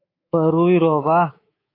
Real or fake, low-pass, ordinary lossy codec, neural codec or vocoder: fake; 5.4 kHz; AAC, 24 kbps; codec, 24 kHz, 3.1 kbps, DualCodec